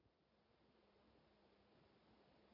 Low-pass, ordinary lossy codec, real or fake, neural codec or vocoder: 5.4 kHz; MP3, 32 kbps; real; none